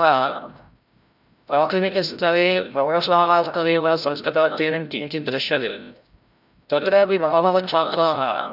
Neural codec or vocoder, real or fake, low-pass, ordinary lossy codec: codec, 16 kHz, 0.5 kbps, FreqCodec, larger model; fake; 5.4 kHz; none